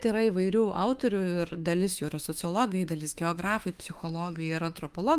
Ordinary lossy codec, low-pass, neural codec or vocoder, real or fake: Opus, 32 kbps; 14.4 kHz; autoencoder, 48 kHz, 32 numbers a frame, DAC-VAE, trained on Japanese speech; fake